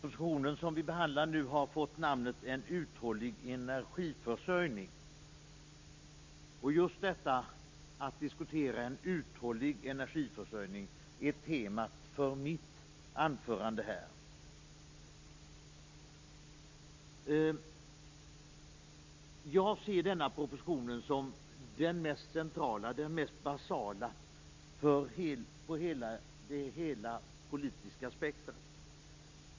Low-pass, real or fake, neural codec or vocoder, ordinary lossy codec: 7.2 kHz; real; none; MP3, 48 kbps